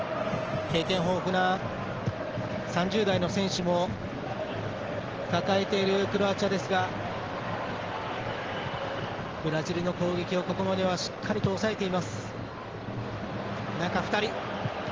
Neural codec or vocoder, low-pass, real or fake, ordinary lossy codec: none; 7.2 kHz; real; Opus, 16 kbps